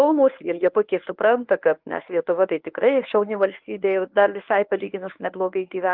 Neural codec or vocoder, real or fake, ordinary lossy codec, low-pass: codec, 24 kHz, 0.9 kbps, WavTokenizer, medium speech release version 1; fake; Opus, 24 kbps; 5.4 kHz